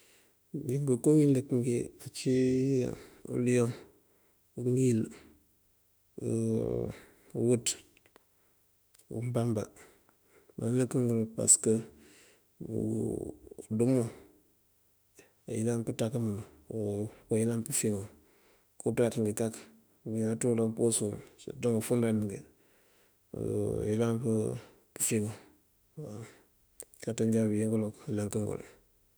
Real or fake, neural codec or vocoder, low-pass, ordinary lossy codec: fake; autoencoder, 48 kHz, 32 numbers a frame, DAC-VAE, trained on Japanese speech; none; none